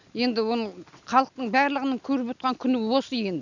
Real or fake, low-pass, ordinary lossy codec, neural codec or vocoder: real; 7.2 kHz; none; none